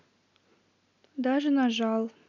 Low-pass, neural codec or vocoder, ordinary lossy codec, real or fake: 7.2 kHz; none; none; real